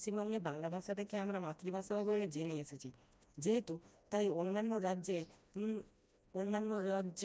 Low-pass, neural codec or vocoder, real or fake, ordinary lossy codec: none; codec, 16 kHz, 1 kbps, FreqCodec, smaller model; fake; none